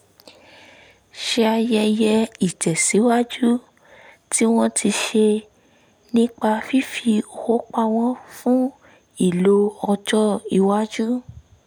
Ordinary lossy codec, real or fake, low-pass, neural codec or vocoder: none; real; none; none